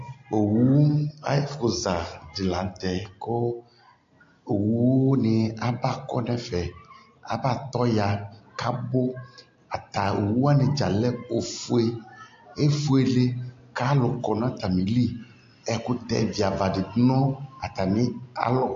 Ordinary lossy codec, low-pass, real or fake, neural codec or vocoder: AAC, 48 kbps; 7.2 kHz; real; none